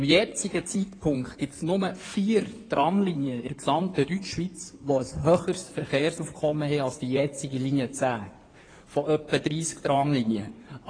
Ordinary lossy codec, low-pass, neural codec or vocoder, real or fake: AAC, 32 kbps; 9.9 kHz; codec, 16 kHz in and 24 kHz out, 2.2 kbps, FireRedTTS-2 codec; fake